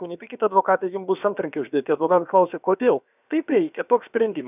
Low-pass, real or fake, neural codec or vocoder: 3.6 kHz; fake; codec, 16 kHz, about 1 kbps, DyCAST, with the encoder's durations